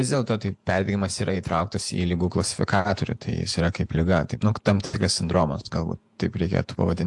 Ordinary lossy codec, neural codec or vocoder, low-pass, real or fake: AAC, 64 kbps; vocoder, 48 kHz, 128 mel bands, Vocos; 10.8 kHz; fake